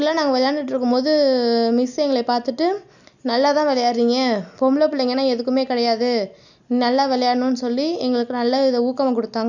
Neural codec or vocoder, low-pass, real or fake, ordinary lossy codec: none; 7.2 kHz; real; none